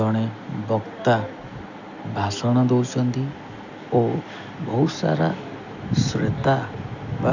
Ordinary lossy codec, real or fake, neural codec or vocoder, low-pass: none; real; none; 7.2 kHz